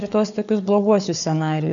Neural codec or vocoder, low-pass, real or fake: codec, 16 kHz, 4 kbps, FunCodec, trained on Chinese and English, 50 frames a second; 7.2 kHz; fake